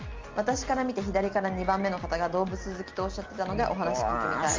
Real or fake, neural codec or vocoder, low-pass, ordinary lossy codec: real; none; 7.2 kHz; Opus, 32 kbps